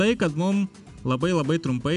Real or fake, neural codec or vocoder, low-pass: real; none; 10.8 kHz